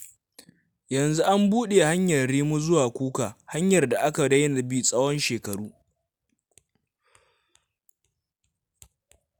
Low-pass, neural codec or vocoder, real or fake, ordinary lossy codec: none; none; real; none